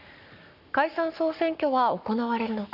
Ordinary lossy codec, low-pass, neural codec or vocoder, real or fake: none; 5.4 kHz; codec, 44.1 kHz, 7.8 kbps, Pupu-Codec; fake